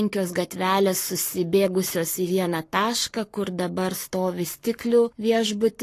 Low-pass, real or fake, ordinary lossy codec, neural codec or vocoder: 14.4 kHz; fake; AAC, 48 kbps; codec, 44.1 kHz, 7.8 kbps, Pupu-Codec